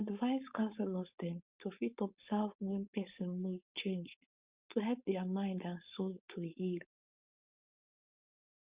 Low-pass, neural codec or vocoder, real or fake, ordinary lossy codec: 3.6 kHz; codec, 16 kHz, 4.8 kbps, FACodec; fake; Opus, 64 kbps